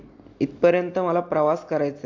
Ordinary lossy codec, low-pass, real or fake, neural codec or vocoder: AAC, 48 kbps; 7.2 kHz; real; none